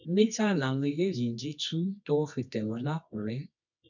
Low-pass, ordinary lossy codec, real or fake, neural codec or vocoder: 7.2 kHz; none; fake; codec, 24 kHz, 0.9 kbps, WavTokenizer, medium music audio release